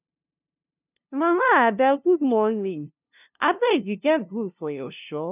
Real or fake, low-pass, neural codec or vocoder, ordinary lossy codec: fake; 3.6 kHz; codec, 16 kHz, 0.5 kbps, FunCodec, trained on LibriTTS, 25 frames a second; none